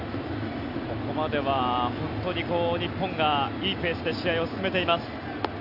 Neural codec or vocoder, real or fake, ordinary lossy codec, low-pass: none; real; none; 5.4 kHz